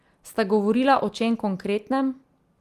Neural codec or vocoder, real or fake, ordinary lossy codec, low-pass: none; real; Opus, 24 kbps; 14.4 kHz